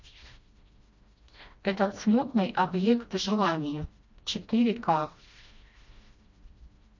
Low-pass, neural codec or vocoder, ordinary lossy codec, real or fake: 7.2 kHz; codec, 16 kHz, 1 kbps, FreqCodec, smaller model; MP3, 48 kbps; fake